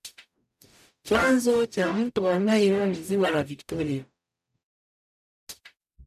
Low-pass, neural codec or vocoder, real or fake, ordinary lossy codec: 14.4 kHz; codec, 44.1 kHz, 0.9 kbps, DAC; fake; none